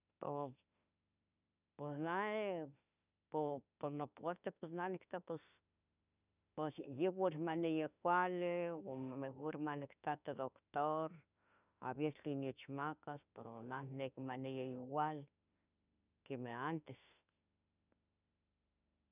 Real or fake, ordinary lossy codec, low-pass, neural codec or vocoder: fake; none; 3.6 kHz; autoencoder, 48 kHz, 32 numbers a frame, DAC-VAE, trained on Japanese speech